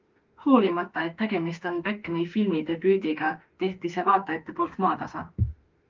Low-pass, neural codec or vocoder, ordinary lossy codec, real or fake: 7.2 kHz; autoencoder, 48 kHz, 32 numbers a frame, DAC-VAE, trained on Japanese speech; Opus, 32 kbps; fake